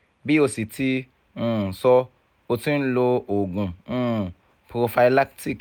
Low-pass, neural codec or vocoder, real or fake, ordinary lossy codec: none; none; real; none